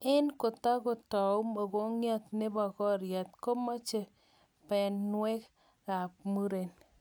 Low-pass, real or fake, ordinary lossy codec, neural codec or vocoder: none; real; none; none